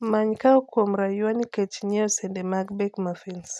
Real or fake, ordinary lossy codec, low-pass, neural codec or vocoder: real; none; none; none